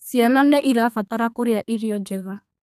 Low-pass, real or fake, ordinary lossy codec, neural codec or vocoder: 14.4 kHz; fake; none; codec, 32 kHz, 1.9 kbps, SNAC